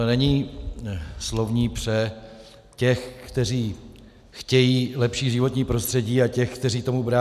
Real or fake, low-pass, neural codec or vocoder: real; 14.4 kHz; none